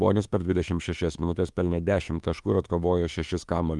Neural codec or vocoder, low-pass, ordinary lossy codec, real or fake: autoencoder, 48 kHz, 32 numbers a frame, DAC-VAE, trained on Japanese speech; 10.8 kHz; Opus, 32 kbps; fake